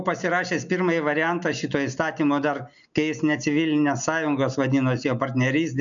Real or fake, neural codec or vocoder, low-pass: real; none; 7.2 kHz